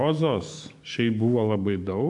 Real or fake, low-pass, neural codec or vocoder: fake; 10.8 kHz; codec, 44.1 kHz, 7.8 kbps, DAC